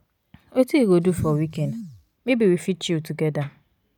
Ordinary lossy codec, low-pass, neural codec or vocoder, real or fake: none; 19.8 kHz; none; real